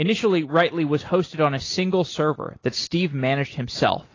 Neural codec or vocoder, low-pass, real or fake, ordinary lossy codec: none; 7.2 kHz; real; AAC, 32 kbps